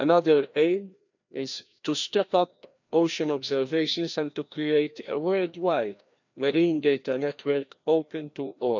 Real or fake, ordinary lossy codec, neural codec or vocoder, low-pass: fake; none; codec, 16 kHz, 1 kbps, FreqCodec, larger model; 7.2 kHz